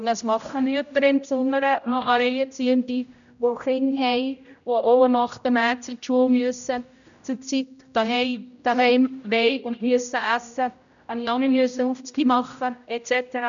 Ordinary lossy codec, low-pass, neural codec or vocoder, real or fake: none; 7.2 kHz; codec, 16 kHz, 0.5 kbps, X-Codec, HuBERT features, trained on general audio; fake